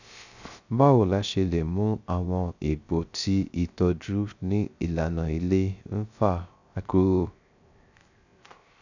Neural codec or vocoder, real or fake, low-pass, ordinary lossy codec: codec, 16 kHz, 0.3 kbps, FocalCodec; fake; 7.2 kHz; none